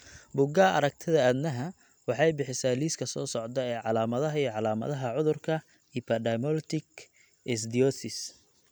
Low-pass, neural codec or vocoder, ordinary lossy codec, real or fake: none; none; none; real